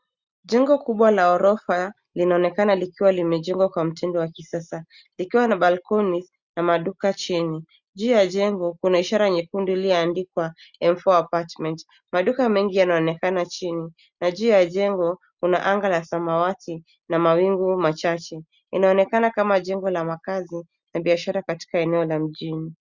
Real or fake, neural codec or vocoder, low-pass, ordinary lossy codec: real; none; 7.2 kHz; Opus, 64 kbps